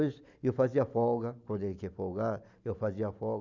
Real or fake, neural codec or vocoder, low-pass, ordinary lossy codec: real; none; 7.2 kHz; none